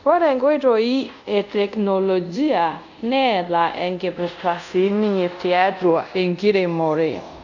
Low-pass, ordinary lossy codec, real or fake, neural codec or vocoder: 7.2 kHz; none; fake; codec, 24 kHz, 0.5 kbps, DualCodec